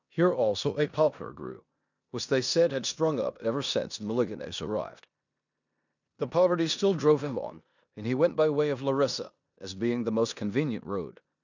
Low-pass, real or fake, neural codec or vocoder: 7.2 kHz; fake; codec, 16 kHz in and 24 kHz out, 0.9 kbps, LongCat-Audio-Codec, four codebook decoder